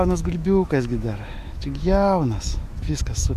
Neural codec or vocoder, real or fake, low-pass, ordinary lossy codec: autoencoder, 48 kHz, 128 numbers a frame, DAC-VAE, trained on Japanese speech; fake; 14.4 kHz; Opus, 64 kbps